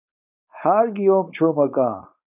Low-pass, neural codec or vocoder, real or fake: 3.6 kHz; codec, 16 kHz, 4.8 kbps, FACodec; fake